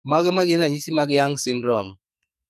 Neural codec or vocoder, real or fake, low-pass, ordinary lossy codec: codec, 44.1 kHz, 2.6 kbps, SNAC; fake; 14.4 kHz; none